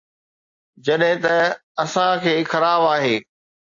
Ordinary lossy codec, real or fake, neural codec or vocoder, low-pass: AAC, 48 kbps; real; none; 7.2 kHz